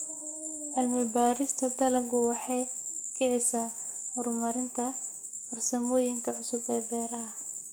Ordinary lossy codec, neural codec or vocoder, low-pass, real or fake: none; codec, 44.1 kHz, 7.8 kbps, DAC; none; fake